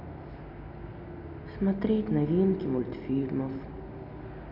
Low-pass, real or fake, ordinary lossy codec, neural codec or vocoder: 5.4 kHz; real; none; none